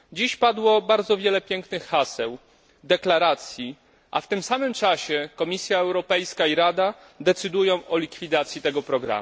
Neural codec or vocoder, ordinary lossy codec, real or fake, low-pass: none; none; real; none